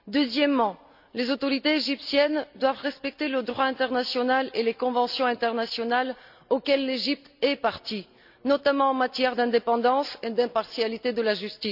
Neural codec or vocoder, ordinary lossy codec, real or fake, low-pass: none; none; real; 5.4 kHz